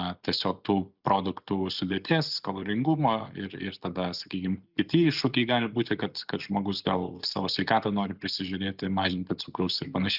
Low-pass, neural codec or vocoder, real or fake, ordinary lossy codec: 5.4 kHz; none; real; Opus, 64 kbps